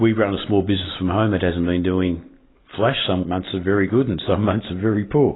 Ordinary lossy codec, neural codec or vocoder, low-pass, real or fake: AAC, 16 kbps; none; 7.2 kHz; real